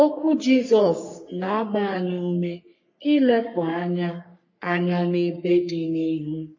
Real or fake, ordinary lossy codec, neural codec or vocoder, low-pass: fake; MP3, 32 kbps; codec, 44.1 kHz, 3.4 kbps, Pupu-Codec; 7.2 kHz